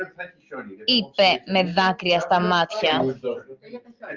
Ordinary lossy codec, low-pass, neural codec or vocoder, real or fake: Opus, 32 kbps; 7.2 kHz; none; real